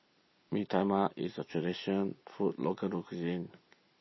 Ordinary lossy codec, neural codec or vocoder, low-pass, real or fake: MP3, 24 kbps; none; 7.2 kHz; real